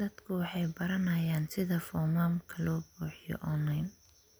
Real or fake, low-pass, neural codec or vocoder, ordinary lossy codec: real; none; none; none